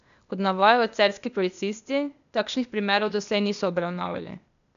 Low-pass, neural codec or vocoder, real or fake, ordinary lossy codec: 7.2 kHz; codec, 16 kHz, 0.8 kbps, ZipCodec; fake; none